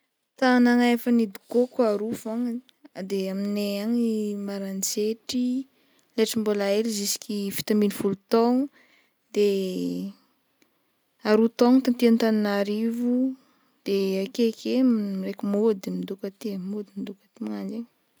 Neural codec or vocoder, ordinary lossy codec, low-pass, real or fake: none; none; none; real